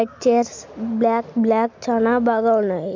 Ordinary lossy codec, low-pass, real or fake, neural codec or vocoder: MP3, 48 kbps; 7.2 kHz; real; none